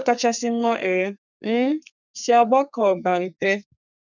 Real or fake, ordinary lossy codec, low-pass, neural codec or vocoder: fake; none; 7.2 kHz; codec, 32 kHz, 1.9 kbps, SNAC